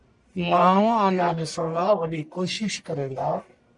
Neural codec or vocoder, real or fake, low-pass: codec, 44.1 kHz, 1.7 kbps, Pupu-Codec; fake; 10.8 kHz